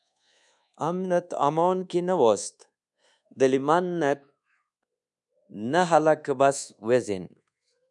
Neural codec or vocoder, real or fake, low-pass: codec, 24 kHz, 1.2 kbps, DualCodec; fake; 10.8 kHz